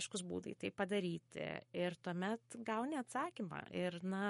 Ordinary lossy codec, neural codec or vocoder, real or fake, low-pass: MP3, 48 kbps; codec, 44.1 kHz, 7.8 kbps, Pupu-Codec; fake; 19.8 kHz